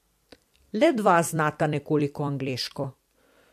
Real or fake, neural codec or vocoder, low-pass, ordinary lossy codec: fake; vocoder, 44.1 kHz, 128 mel bands every 256 samples, BigVGAN v2; 14.4 kHz; MP3, 64 kbps